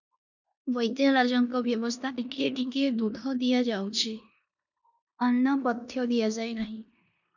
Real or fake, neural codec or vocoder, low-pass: fake; codec, 16 kHz in and 24 kHz out, 0.9 kbps, LongCat-Audio-Codec, four codebook decoder; 7.2 kHz